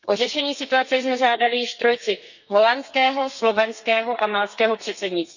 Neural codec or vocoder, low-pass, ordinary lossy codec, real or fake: codec, 32 kHz, 1.9 kbps, SNAC; 7.2 kHz; none; fake